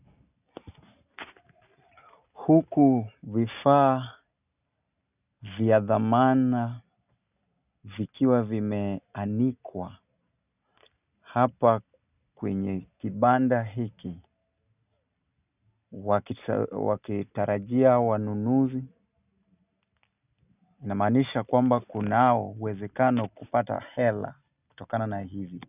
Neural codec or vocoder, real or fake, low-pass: none; real; 3.6 kHz